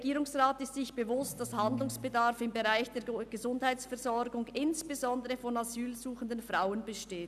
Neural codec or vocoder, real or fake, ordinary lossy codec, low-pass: none; real; none; 14.4 kHz